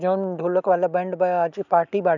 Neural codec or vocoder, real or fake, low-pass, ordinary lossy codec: none; real; 7.2 kHz; none